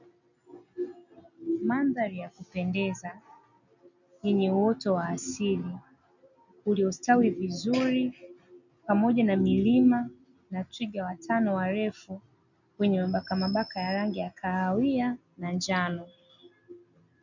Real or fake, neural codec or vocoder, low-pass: real; none; 7.2 kHz